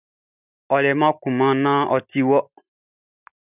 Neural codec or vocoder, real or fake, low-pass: none; real; 3.6 kHz